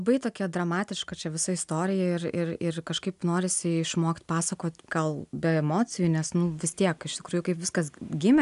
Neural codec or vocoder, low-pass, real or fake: none; 10.8 kHz; real